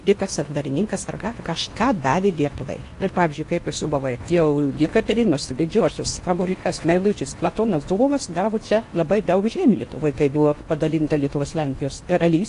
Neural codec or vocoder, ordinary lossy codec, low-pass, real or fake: codec, 16 kHz in and 24 kHz out, 0.8 kbps, FocalCodec, streaming, 65536 codes; AAC, 48 kbps; 10.8 kHz; fake